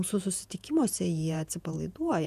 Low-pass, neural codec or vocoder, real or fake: 14.4 kHz; vocoder, 48 kHz, 128 mel bands, Vocos; fake